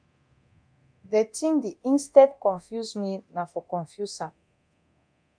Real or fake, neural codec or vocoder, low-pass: fake; codec, 24 kHz, 0.9 kbps, DualCodec; 9.9 kHz